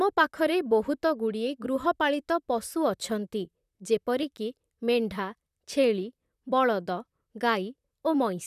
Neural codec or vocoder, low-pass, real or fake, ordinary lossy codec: vocoder, 44.1 kHz, 128 mel bands, Pupu-Vocoder; 14.4 kHz; fake; none